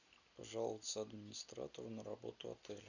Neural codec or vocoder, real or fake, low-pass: none; real; 7.2 kHz